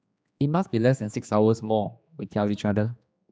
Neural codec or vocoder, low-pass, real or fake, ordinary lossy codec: codec, 16 kHz, 2 kbps, X-Codec, HuBERT features, trained on general audio; none; fake; none